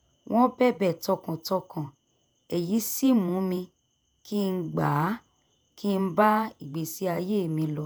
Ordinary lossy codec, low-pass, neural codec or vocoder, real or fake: none; none; vocoder, 48 kHz, 128 mel bands, Vocos; fake